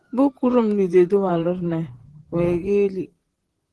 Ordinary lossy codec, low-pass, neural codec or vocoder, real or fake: Opus, 16 kbps; 10.8 kHz; vocoder, 44.1 kHz, 128 mel bands, Pupu-Vocoder; fake